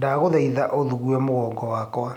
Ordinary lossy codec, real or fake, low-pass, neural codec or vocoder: none; real; 19.8 kHz; none